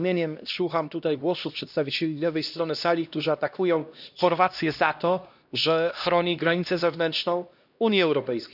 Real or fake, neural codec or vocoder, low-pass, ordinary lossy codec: fake; codec, 16 kHz, 1 kbps, X-Codec, HuBERT features, trained on LibriSpeech; 5.4 kHz; none